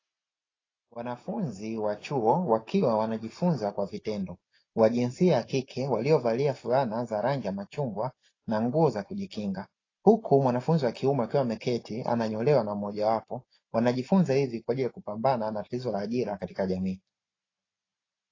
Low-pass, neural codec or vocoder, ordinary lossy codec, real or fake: 7.2 kHz; none; AAC, 32 kbps; real